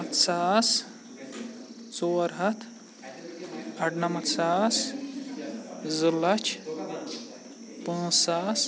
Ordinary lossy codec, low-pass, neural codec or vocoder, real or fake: none; none; none; real